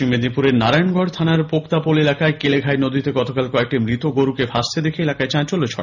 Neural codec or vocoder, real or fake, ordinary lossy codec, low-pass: none; real; none; 7.2 kHz